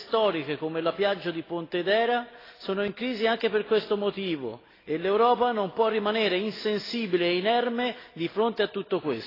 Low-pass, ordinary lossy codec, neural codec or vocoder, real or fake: 5.4 kHz; AAC, 24 kbps; none; real